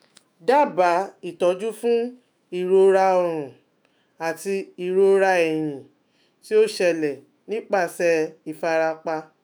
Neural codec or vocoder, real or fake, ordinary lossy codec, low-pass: autoencoder, 48 kHz, 128 numbers a frame, DAC-VAE, trained on Japanese speech; fake; none; none